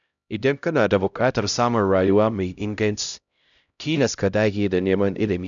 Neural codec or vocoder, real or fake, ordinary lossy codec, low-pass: codec, 16 kHz, 0.5 kbps, X-Codec, HuBERT features, trained on LibriSpeech; fake; none; 7.2 kHz